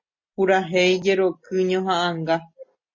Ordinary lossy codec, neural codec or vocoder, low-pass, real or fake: MP3, 32 kbps; none; 7.2 kHz; real